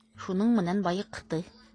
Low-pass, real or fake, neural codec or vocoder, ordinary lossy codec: 9.9 kHz; real; none; AAC, 32 kbps